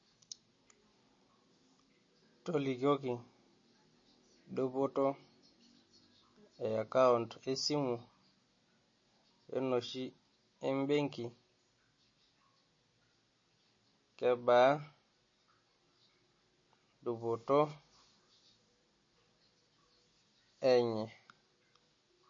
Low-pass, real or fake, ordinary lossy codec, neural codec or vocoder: 7.2 kHz; real; MP3, 32 kbps; none